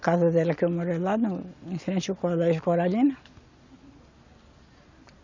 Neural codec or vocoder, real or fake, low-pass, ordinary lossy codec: none; real; 7.2 kHz; MP3, 64 kbps